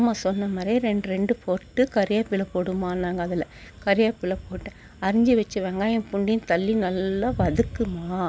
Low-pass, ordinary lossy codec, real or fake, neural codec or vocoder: none; none; real; none